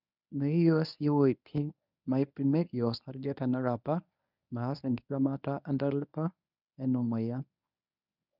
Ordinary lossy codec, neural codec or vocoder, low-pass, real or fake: none; codec, 24 kHz, 0.9 kbps, WavTokenizer, medium speech release version 1; 5.4 kHz; fake